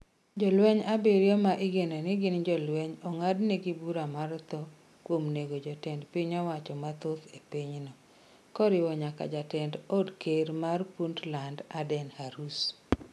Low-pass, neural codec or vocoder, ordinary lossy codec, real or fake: none; none; none; real